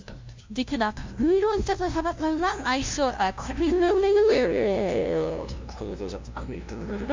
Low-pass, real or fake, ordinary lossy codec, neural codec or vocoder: 7.2 kHz; fake; MP3, 64 kbps; codec, 16 kHz, 0.5 kbps, FunCodec, trained on LibriTTS, 25 frames a second